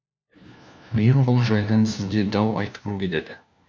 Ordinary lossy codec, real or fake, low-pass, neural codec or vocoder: none; fake; none; codec, 16 kHz, 1 kbps, FunCodec, trained on LibriTTS, 50 frames a second